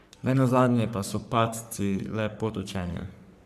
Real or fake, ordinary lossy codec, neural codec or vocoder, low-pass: fake; none; codec, 44.1 kHz, 3.4 kbps, Pupu-Codec; 14.4 kHz